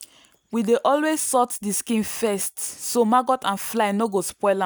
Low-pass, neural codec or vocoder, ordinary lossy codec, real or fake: none; none; none; real